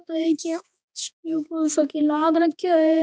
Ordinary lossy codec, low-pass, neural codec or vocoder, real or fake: none; none; codec, 16 kHz, 2 kbps, X-Codec, HuBERT features, trained on balanced general audio; fake